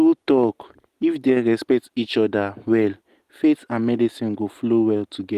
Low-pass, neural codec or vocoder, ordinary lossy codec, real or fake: 14.4 kHz; none; Opus, 24 kbps; real